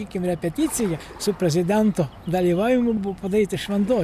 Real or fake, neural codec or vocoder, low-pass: real; none; 14.4 kHz